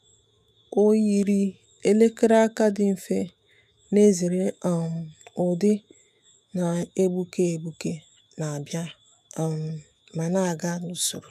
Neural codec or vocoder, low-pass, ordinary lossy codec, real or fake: autoencoder, 48 kHz, 128 numbers a frame, DAC-VAE, trained on Japanese speech; 14.4 kHz; none; fake